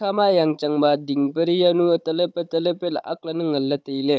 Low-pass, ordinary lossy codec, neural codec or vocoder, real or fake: none; none; codec, 16 kHz, 16 kbps, FunCodec, trained on Chinese and English, 50 frames a second; fake